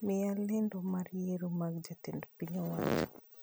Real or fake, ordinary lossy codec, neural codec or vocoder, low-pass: real; none; none; none